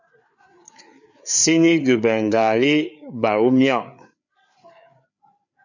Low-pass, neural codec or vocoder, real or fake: 7.2 kHz; codec, 16 kHz, 4 kbps, FreqCodec, larger model; fake